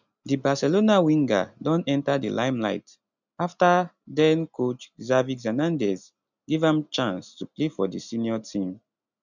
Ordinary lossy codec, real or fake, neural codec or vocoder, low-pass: none; real; none; 7.2 kHz